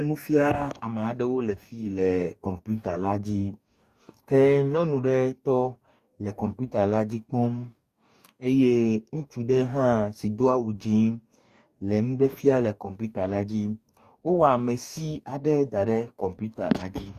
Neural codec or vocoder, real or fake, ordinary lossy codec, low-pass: codec, 44.1 kHz, 2.6 kbps, DAC; fake; Opus, 64 kbps; 14.4 kHz